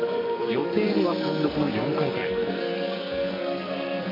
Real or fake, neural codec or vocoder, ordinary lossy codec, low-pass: fake; codec, 44.1 kHz, 2.6 kbps, SNAC; MP3, 48 kbps; 5.4 kHz